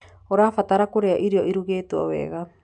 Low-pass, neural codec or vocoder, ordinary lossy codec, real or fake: 9.9 kHz; none; none; real